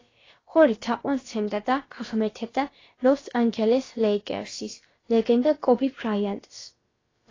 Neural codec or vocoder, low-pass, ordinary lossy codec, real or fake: codec, 16 kHz, about 1 kbps, DyCAST, with the encoder's durations; 7.2 kHz; AAC, 32 kbps; fake